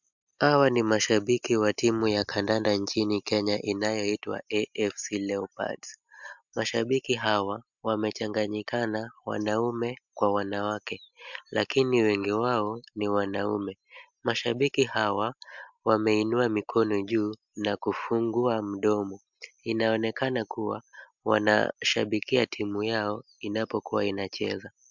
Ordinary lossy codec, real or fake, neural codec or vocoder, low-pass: MP3, 64 kbps; real; none; 7.2 kHz